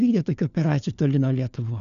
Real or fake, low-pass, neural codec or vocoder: fake; 7.2 kHz; codec, 16 kHz, 4 kbps, FunCodec, trained on LibriTTS, 50 frames a second